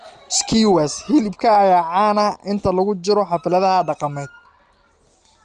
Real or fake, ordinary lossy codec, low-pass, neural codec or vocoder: real; Opus, 24 kbps; 10.8 kHz; none